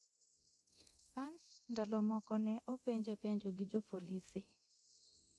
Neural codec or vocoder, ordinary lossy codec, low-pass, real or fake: codec, 24 kHz, 0.9 kbps, DualCodec; none; none; fake